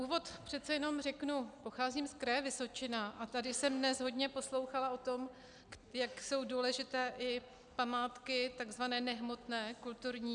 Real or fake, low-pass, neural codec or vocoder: real; 9.9 kHz; none